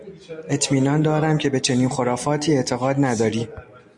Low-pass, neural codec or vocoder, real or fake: 10.8 kHz; none; real